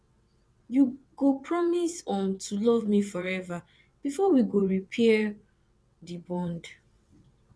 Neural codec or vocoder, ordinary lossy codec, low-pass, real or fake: vocoder, 22.05 kHz, 80 mel bands, WaveNeXt; none; none; fake